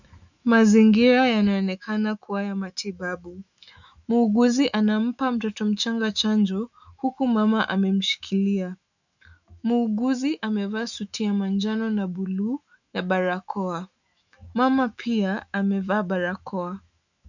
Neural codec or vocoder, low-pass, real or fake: autoencoder, 48 kHz, 128 numbers a frame, DAC-VAE, trained on Japanese speech; 7.2 kHz; fake